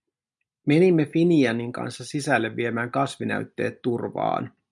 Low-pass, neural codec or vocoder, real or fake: 10.8 kHz; none; real